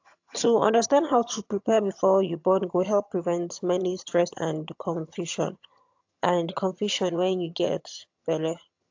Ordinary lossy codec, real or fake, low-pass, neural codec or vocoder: AAC, 48 kbps; fake; 7.2 kHz; vocoder, 22.05 kHz, 80 mel bands, HiFi-GAN